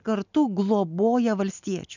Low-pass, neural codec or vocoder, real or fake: 7.2 kHz; none; real